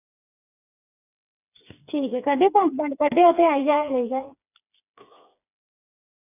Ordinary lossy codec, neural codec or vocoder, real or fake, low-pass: none; codec, 16 kHz, 4 kbps, FreqCodec, smaller model; fake; 3.6 kHz